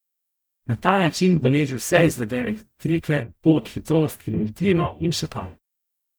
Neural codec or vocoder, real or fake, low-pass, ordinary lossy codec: codec, 44.1 kHz, 0.9 kbps, DAC; fake; none; none